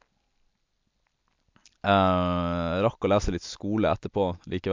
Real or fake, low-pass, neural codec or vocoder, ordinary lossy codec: real; 7.2 kHz; none; MP3, 64 kbps